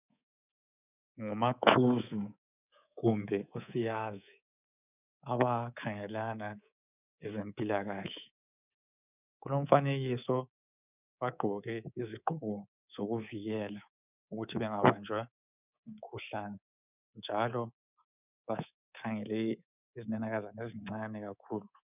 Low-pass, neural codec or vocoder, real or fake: 3.6 kHz; codec, 24 kHz, 3.1 kbps, DualCodec; fake